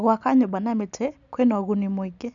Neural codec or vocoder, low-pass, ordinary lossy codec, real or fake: none; 7.2 kHz; none; real